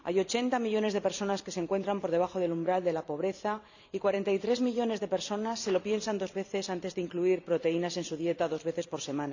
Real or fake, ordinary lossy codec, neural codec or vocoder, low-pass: fake; none; vocoder, 44.1 kHz, 128 mel bands every 256 samples, BigVGAN v2; 7.2 kHz